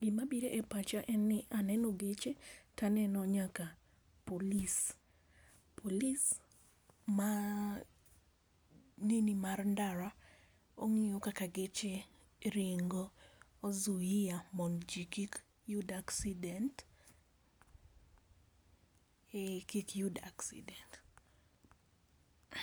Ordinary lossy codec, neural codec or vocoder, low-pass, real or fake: none; none; none; real